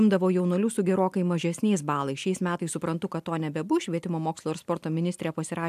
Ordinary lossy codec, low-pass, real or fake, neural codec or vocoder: AAC, 96 kbps; 14.4 kHz; real; none